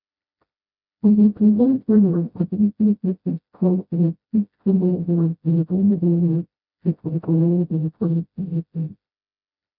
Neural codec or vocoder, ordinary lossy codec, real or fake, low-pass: codec, 16 kHz, 0.5 kbps, FreqCodec, smaller model; none; fake; 5.4 kHz